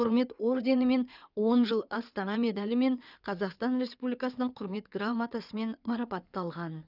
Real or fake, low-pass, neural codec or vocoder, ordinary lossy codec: fake; 5.4 kHz; codec, 16 kHz in and 24 kHz out, 2.2 kbps, FireRedTTS-2 codec; none